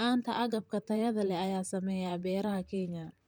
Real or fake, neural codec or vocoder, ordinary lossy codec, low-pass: fake; vocoder, 44.1 kHz, 128 mel bands, Pupu-Vocoder; none; none